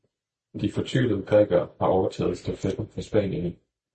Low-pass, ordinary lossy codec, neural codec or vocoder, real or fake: 9.9 kHz; MP3, 32 kbps; none; real